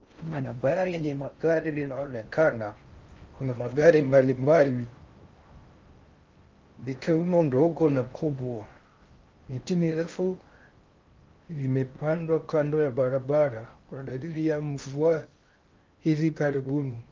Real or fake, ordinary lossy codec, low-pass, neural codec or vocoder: fake; Opus, 32 kbps; 7.2 kHz; codec, 16 kHz in and 24 kHz out, 0.6 kbps, FocalCodec, streaming, 4096 codes